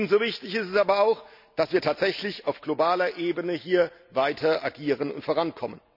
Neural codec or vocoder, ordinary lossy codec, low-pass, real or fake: none; none; 5.4 kHz; real